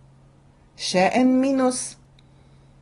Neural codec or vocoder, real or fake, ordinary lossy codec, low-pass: none; real; AAC, 32 kbps; 10.8 kHz